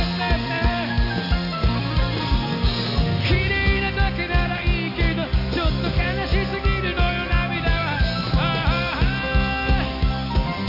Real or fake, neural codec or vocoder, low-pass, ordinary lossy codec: real; none; 5.4 kHz; none